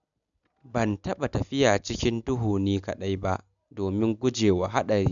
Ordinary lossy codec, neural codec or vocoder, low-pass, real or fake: none; none; 7.2 kHz; real